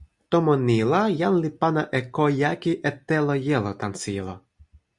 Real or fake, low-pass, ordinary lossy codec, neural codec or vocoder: real; 10.8 kHz; Opus, 64 kbps; none